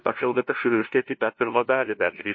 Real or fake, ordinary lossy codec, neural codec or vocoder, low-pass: fake; MP3, 32 kbps; codec, 16 kHz, 0.5 kbps, FunCodec, trained on LibriTTS, 25 frames a second; 7.2 kHz